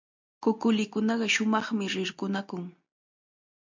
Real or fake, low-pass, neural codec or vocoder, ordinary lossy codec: real; 7.2 kHz; none; MP3, 64 kbps